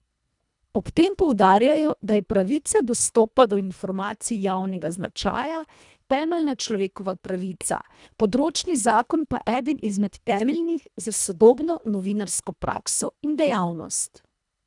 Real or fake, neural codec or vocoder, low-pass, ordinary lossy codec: fake; codec, 24 kHz, 1.5 kbps, HILCodec; 10.8 kHz; none